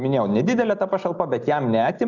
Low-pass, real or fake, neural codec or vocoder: 7.2 kHz; real; none